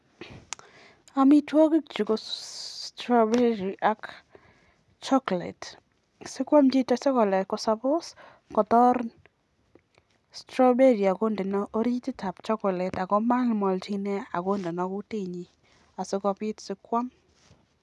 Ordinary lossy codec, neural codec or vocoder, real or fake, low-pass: none; none; real; none